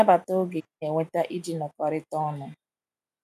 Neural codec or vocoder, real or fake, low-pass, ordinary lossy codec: none; real; 14.4 kHz; none